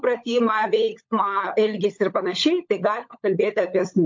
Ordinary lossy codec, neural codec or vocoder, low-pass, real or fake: MP3, 48 kbps; codec, 16 kHz, 16 kbps, FunCodec, trained on LibriTTS, 50 frames a second; 7.2 kHz; fake